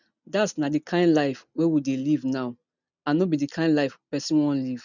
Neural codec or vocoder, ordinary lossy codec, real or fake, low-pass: none; none; real; 7.2 kHz